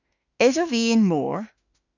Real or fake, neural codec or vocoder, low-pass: fake; autoencoder, 48 kHz, 32 numbers a frame, DAC-VAE, trained on Japanese speech; 7.2 kHz